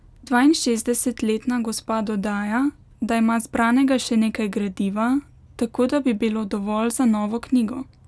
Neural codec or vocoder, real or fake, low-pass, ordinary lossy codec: none; real; none; none